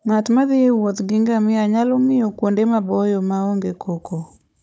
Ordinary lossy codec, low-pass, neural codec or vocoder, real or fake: none; none; codec, 16 kHz, 16 kbps, FunCodec, trained on Chinese and English, 50 frames a second; fake